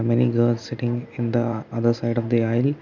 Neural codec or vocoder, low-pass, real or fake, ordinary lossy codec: none; 7.2 kHz; real; none